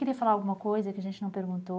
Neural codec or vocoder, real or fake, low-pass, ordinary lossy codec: none; real; none; none